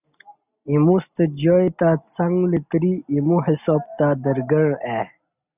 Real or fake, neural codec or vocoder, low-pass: real; none; 3.6 kHz